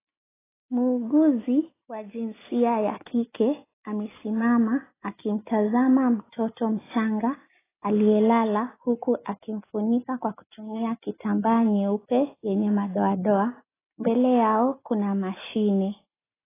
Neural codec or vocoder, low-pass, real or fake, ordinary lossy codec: none; 3.6 kHz; real; AAC, 16 kbps